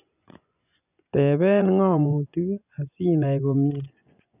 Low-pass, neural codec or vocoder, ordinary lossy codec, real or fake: 3.6 kHz; vocoder, 24 kHz, 100 mel bands, Vocos; none; fake